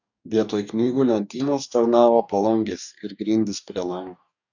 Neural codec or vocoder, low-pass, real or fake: codec, 44.1 kHz, 2.6 kbps, DAC; 7.2 kHz; fake